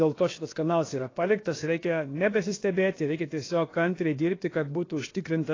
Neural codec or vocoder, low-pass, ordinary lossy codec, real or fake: codec, 16 kHz, about 1 kbps, DyCAST, with the encoder's durations; 7.2 kHz; AAC, 32 kbps; fake